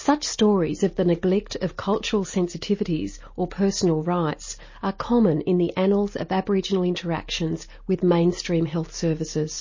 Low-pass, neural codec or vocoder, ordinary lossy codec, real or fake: 7.2 kHz; none; MP3, 32 kbps; real